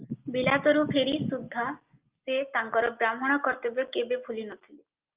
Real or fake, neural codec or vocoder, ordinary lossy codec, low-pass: real; none; Opus, 32 kbps; 3.6 kHz